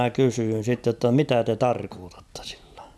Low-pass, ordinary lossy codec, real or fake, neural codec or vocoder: none; none; real; none